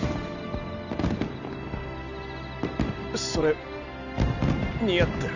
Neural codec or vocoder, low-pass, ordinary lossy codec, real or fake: none; 7.2 kHz; none; real